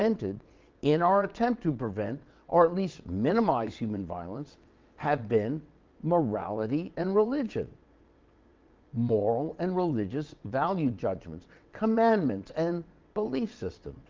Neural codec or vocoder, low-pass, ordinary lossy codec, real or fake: vocoder, 22.05 kHz, 80 mel bands, WaveNeXt; 7.2 kHz; Opus, 24 kbps; fake